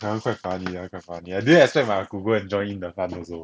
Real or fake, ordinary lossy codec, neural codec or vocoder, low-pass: real; none; none; none